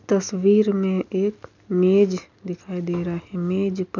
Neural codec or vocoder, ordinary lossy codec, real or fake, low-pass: none; none; real; 7.2 kHz